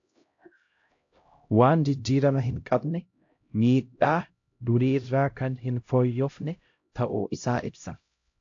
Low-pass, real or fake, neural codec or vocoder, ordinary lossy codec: 7.2 kHz; fake; codec, 16 kHz, 0.5 kbps, X-Codec, HuBERT features, trained on LibriSpeech; AAC, 48 kbps